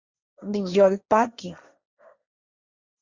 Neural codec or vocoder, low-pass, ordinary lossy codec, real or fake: codec, 16 kHz, 1.1 kbps, Voila-Tokenizer; 7.2 kHz; Opus, 64 kbps; fake